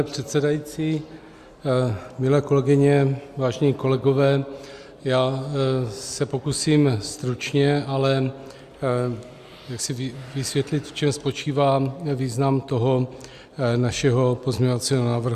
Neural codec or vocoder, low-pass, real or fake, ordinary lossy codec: none; 14.4 kHz; real; Opus, 64 kbps